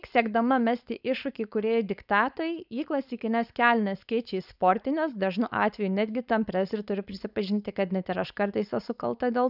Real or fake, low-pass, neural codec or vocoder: fake; 5.4 kHz; codec, 16 kHz, 4.8 kbps, FACodec